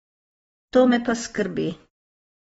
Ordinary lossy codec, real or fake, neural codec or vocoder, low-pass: AAC, 24 kbps; real; none; 19.8 kHz